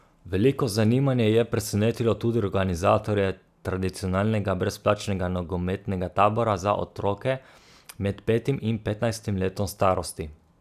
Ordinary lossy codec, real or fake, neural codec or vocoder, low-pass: none; real; none; 14.4 kHz